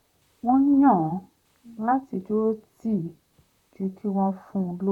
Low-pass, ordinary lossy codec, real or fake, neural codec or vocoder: 19.8 kHz; none; fake; vocoder, 44.1 kHz, 128 mel bands, Pupu-Vocoder